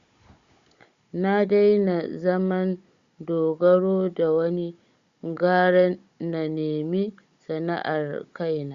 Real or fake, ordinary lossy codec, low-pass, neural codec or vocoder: fake; Opus, 64 kbps; 7.2 kHz; codec, 16 kHz, 16 kbps, FunCodec, trained on Chinese and English, 50 frames a second